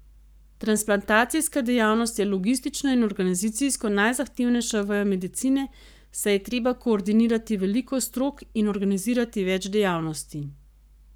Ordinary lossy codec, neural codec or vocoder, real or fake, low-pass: none; codec, 44.1 kHz, 7.8 kbps, Pupu-Codec; fake; none